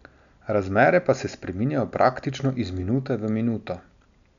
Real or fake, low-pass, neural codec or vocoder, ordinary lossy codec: real; 7.2 kHz; none; none